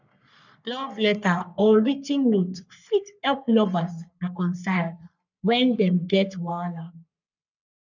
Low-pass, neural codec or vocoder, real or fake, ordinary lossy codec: 7.2 kHz; codec, 44.1 kHz, 3.4 kbps, Pupu-Codec; fake; none